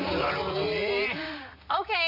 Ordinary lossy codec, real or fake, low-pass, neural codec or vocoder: none; fake; 5.4 kHz; vocoder, 44.1 kHz, 128 mel bands, Pupu-Vocoder